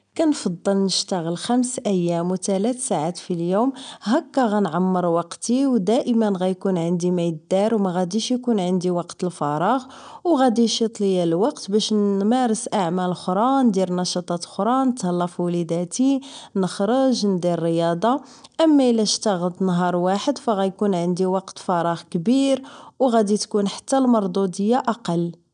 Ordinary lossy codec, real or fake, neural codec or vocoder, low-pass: none; real; none; 9.9 kHz